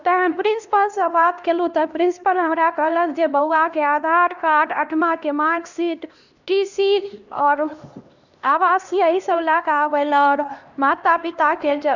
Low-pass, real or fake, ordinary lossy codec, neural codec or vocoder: 7.2 kHz; fake; none; codec, 16 kHz, 1 kbps, X-Codec, HuBERT features, trained on LibriSpeech